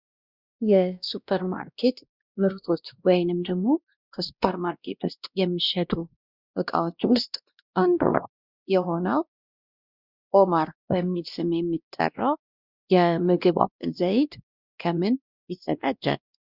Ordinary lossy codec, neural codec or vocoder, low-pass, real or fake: Opus, 64 kbps; codec, 16 kHz, 1 kbps, X-Codec, WavLM features, trained on Multilingual LibriSpeech; 5.4 kHz; fake